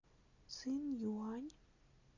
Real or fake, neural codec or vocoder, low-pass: real; none; 7.2 kHz